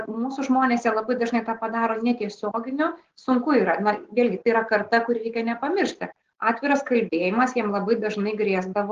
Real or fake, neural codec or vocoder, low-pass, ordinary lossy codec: real; none; 7.2 kHz; Opus, 16 kbps